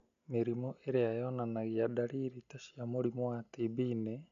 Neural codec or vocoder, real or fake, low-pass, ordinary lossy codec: none; real; 7.2 kHz; none